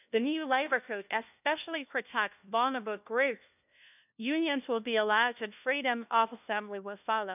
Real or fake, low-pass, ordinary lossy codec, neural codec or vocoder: fake; 3.6 kHz; none; codec, 16 kHz, 1 kbps, FunCodec, trained on LibriTTS, 50 frames a second